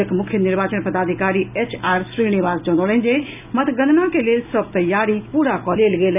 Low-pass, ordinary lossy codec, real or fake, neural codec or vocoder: 3.6 kHz; none; real; none